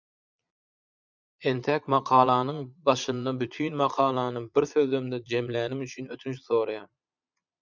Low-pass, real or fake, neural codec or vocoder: 7.2 kHz; fake; vocoder, 22.05 kHz, 80 mel bands, Vocos